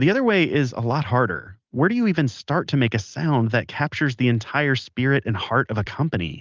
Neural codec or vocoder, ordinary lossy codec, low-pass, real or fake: none; Opus, 24 kbps; 7.2 kHz; real